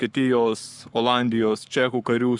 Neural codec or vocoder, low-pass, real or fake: codec, 44.1 kHz, 7.8 kbps, Pupu-Codec; 10.8 kHz; fake